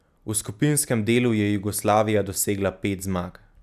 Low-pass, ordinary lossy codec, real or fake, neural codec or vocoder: 14.4 kHz; none; real; none